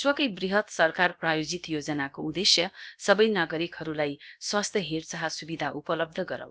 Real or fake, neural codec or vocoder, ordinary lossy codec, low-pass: fake; codec, 16 kHz, about 1 kbps, DyCAST, with the encoder's durations; none; none